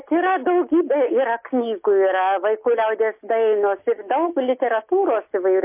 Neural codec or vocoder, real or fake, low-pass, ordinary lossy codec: none; real; 3.6 kHz; MP3, 24 kbps